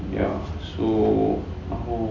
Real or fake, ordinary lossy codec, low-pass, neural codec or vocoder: real; none; 7.2 kHz; none